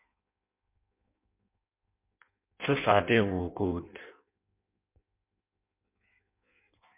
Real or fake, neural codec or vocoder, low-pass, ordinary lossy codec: fake; codec, 16 kHz in and 24 kHz out, 0.6 kbps, FireRedTTS-2 codec; 3.6 kHz; MP3, 32 kbps